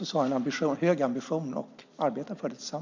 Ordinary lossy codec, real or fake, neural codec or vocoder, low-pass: MP3, 64 kbps; real; none; 7.2 kHz